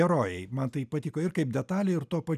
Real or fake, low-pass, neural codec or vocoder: real; 14.4 kHz; none